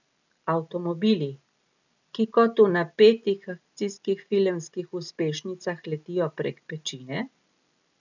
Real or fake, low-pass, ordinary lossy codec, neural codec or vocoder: real; 7.2 kHz; none; none